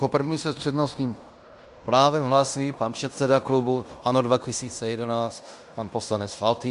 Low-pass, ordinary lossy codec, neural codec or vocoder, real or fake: 10.8 kHz; Opus, 64 kbps; codec, 16 kHz in and 24 kHz out, 0.9 kbps, LongCat-Audio-Codec, fine tuned four codebook decoder; fake